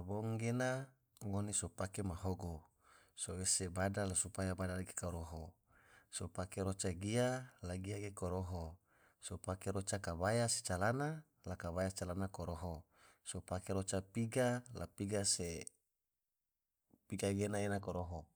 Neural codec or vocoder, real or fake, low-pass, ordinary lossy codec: none; real; none; none